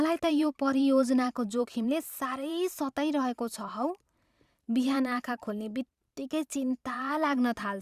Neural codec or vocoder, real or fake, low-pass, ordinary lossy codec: vocoder, 44.1 kHz, 128 mel bands every 512 samples, BigVGAN v2; fake; 14.4 kHz; Opus, 64 kbps